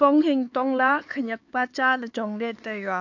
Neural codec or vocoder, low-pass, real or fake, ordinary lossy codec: codec, 16 kHz, 4 kbps, X-Codec, HuBERT features, trained on LibriSpeech; 7.2 kHz; fake; AAC, 48 kbps